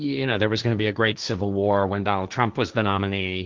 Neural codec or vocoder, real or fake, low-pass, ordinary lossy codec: codec, 16 kHz, 1.1 kbps, Voila-Tokenizer; fake; 7.2 kHz; Opus, 32 kbps